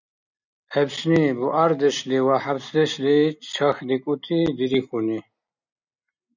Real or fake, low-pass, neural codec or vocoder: real; 7.2 kHz; none